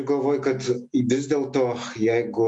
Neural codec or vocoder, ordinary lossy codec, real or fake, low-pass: none; MP3, 64 kbps; real; 10.8 kHz